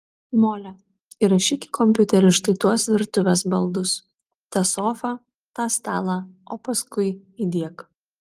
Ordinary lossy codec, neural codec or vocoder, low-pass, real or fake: Opus, 24 kbps; none; 14.4 kHz; real